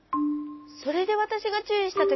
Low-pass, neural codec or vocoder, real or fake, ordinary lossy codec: 7.2 kHz; none; real; MP3, 24 kbps